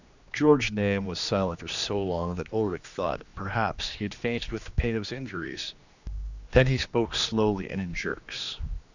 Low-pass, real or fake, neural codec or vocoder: 7.2 kHz; fake; codec, 16 kHz, 2 kbps, X-Codec, HuBERT features, trained on general audio